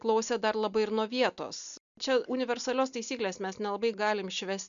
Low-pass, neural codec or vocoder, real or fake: 7.2 kHz; none; real